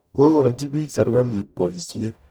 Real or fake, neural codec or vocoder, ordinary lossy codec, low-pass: fake; codec, 44.1 kHz, 0.9 kbps, DAC; none; none